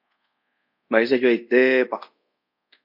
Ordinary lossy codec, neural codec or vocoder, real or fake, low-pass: MP3, 48 kbps; codec, 24 kHz, 0.5 kbps, DualCodec; fake; 5.4 kHz